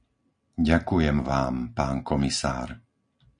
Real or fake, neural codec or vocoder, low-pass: real; none; 9.9 kHz